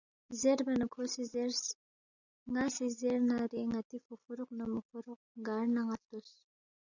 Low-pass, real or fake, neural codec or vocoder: 7.2 kHz; real; none